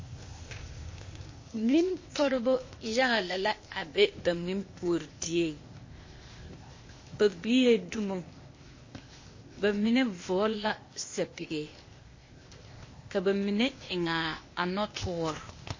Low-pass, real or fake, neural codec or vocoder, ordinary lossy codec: 7.2 kHz; fake; codec, 16 kHz, 0.8 kbps, ZipCodec; MP3, 32 kbps